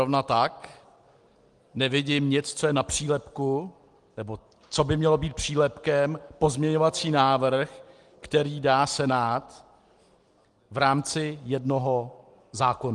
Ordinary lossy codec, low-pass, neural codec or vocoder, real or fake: Opus, 24 kbps; 10.8 kHz; none; real